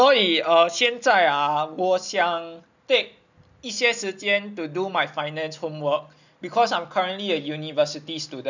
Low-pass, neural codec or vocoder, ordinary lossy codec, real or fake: 7.2 kHz; none; none; real